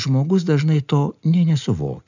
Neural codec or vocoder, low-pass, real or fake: none; 7.2 kHz; real